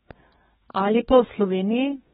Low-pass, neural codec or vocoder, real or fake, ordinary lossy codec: 14.4 kHz; codec, 32 kHz, 1.9 kbps, SNAC; fake; AAC, 16 kbps